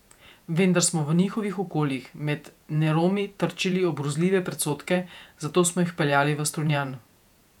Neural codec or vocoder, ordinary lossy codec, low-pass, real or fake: vocoder, 48 kHz, 128 mel bands, Vocos; none; 19.8 kHz; fake